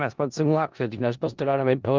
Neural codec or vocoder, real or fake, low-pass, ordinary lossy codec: codec, 16 kHz in and 24 kHz out, 0.4 kbps, LongCat-Audio-Codec, four codebook decoder; fake; 7.2 kHz; Opus, 24 kbps